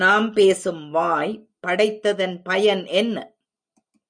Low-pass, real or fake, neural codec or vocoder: 9.9 kHz; real; none